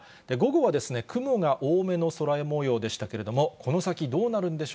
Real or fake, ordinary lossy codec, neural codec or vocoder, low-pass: real; none; none; none